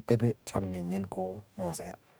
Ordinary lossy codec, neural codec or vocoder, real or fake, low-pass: none; codec, 44.1 kHz, 2.6 kbps, DAC; fake; none